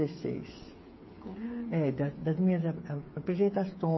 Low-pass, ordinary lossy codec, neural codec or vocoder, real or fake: 7.2 kHz; MP3, 24 kbps; codec, 16 kHz, 8 kbps, FreqCodec, smaller model; fake